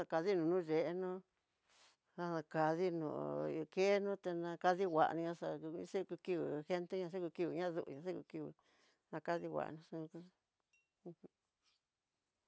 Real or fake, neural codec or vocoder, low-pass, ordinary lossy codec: real; none; none; none